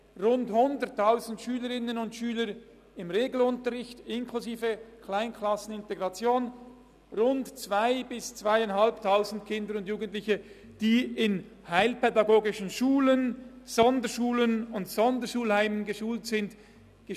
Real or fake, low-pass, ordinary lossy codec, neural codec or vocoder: real; 14.4 kHz; none; none